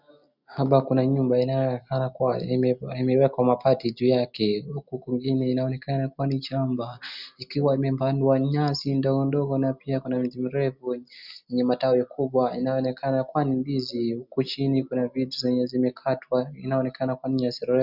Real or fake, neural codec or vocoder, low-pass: real; none; 5.4 kHz